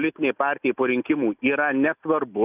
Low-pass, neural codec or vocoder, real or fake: 3.6 kHz; none; real